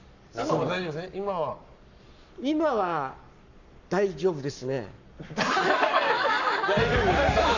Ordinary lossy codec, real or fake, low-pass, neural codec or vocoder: none; fake; 7.2 kHz; codec, 44.1 kHz, 7.8 kbps, Pupu-Codec